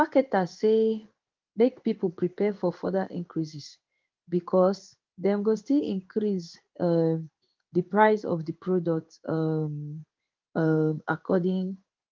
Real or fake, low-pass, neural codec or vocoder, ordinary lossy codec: fake; 7.2 kHz; codec, 16 kHz in and 24 kHz out, 1 kbps, XY-Tokenizer; Opus, 32 kbps